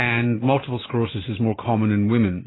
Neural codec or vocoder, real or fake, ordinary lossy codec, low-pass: vocoder, 44.1 kHz, 128 mel bands every 512 samples, BigVGAN v2; fake; AAC, 16 kbps; 7.2 kHz